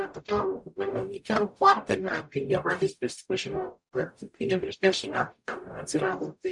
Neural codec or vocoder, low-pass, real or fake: codec, 44.1 kHz, 0.9 kbps, DAC; 10.8 kHz; fake